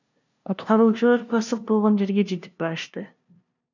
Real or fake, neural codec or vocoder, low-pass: fake; codec, 16 kHz, 0.5 kbps, FunCodec, trained on LibriTTS, 25 frames a second; 7.2 kHz